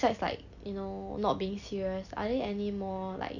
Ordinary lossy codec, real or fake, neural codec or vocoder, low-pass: none; real; none; 7.2 kHz